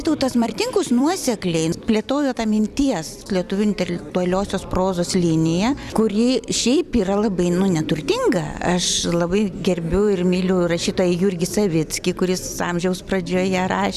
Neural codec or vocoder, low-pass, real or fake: vocoder, 44.1 kHz, 128 mel bands every 256 samples, BigVGAN v2; 14.4 kHz; fake